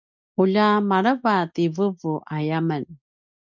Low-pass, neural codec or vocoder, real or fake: 7.2 kHz; none; real